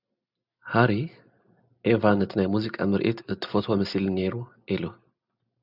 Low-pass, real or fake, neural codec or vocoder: 5.4 kHz; real; none